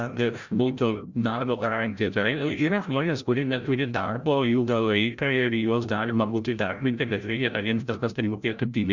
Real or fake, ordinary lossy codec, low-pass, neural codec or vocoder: fake; Opus, 64 kbps; 7.2 kHz; codec, 16 kHz, 0.5 kbps, FreqCodec, larger model